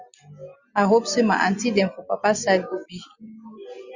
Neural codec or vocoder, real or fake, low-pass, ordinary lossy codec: none; real; 7.2 kHz; Opus, 64 kbps